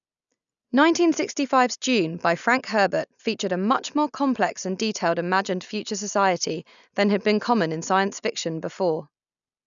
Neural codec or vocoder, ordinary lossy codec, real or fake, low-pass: none; none; real; 7.2 kHz